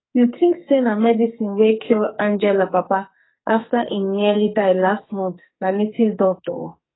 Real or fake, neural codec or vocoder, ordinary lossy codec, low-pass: fake; codec, 44.1 kHz, 2.6 kbps, SNAC; AAC, 16 kbps; 7.2 kHz